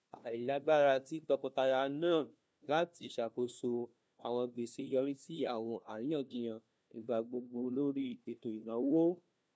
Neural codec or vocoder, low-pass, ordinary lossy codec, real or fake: codec, 16 kHz, 1 kbps, FunCodec, trained on LibriTTS, 50 frames a second; none; none; fake